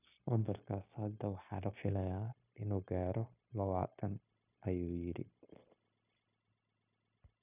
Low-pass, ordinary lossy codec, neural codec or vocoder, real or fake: 3.6 kHz; none; codec, 16 kHz, 0.9 kbps, LongCat-Audio-Codec; fake